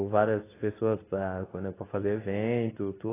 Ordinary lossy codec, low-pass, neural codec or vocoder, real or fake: AAC, 16 kbps; 3.6 kHz; codec, 24 kHz, 0.9 kbps, WavTokenizer, medium speech release version 2; fake